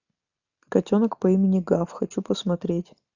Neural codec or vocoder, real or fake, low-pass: none; real; 7.2 kHz